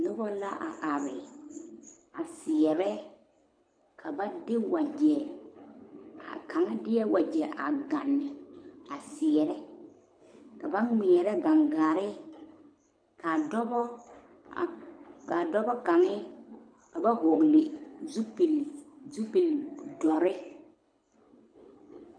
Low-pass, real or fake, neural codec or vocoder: 9.9 kHz; fake; codec, 24 kHz, 6 kbps, HILCodec